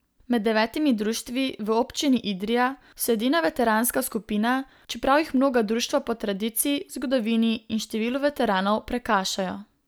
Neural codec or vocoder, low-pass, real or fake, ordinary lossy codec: none; none; real; none